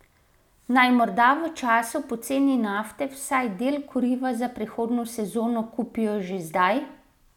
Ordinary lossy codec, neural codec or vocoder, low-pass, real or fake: none; none; 19.8 kHz; real